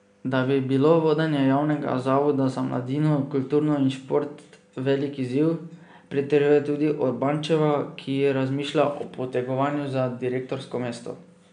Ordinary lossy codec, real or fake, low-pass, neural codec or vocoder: none; real; 9.9 kHz; none